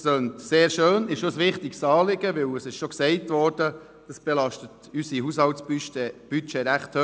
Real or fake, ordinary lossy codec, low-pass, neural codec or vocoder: real; none; none; none